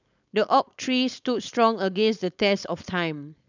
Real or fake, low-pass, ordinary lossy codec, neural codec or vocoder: fake; 7.2 kHz; none; codec, 16 kHz, 4.8 kbps, FACodec